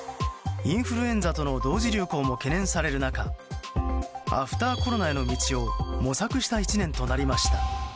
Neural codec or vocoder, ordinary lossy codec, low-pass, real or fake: none; none; none; real